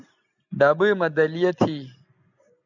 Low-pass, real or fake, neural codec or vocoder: 7.2 kHz; real; none